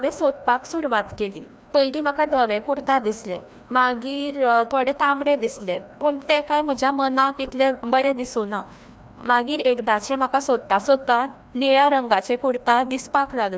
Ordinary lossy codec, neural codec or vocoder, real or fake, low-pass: none; codec, 16 kHz, 1 kbps, FreqCodec, larger model; fake; none